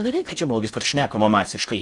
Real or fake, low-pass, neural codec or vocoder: fake; 10.8 kHz; codec, 16 kHz in and 24 kHz out, 0.6 kbps, FocalCodec, streaming, 4096 codes